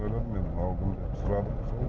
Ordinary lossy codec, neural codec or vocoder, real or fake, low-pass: none; none; real; none